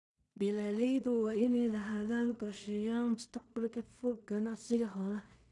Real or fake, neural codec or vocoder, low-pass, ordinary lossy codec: fake; codec, 16 kHz in and 24 kHz out, 0.4 kbps, LongCat-Audio-Codec, two codebook decoder; 10.8 kHz; none